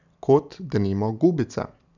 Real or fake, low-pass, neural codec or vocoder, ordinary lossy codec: real; 7.2 kHz; none; none